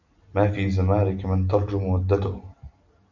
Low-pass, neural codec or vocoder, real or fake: 7.2 kHz; none; real